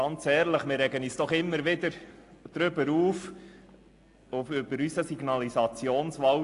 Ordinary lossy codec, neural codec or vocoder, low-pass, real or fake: AAC, 48 kbps; none; 10.8 kHz; real